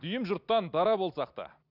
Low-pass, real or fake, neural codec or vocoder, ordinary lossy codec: 5.4 kHz; real; none; none